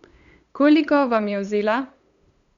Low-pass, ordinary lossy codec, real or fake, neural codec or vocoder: 7.2 kHz; Opus, 64 kbps; fake; codec, 16 kHz, 8 kbps, FunCodec, trained on LibriTTS, 25 frames a second